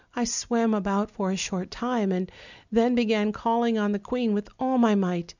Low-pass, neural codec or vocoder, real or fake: 7.2 kHz; none; real